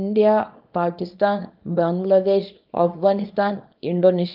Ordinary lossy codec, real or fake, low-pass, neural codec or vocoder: Opus, 32 kbps; fake; 5.4 kHz; codec, 24 kHz, 0.9 kbps, WavTokenizer, small release